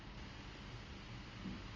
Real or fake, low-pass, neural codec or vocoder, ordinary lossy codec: real; 7.2 kHz; none; Opus, 32 kbps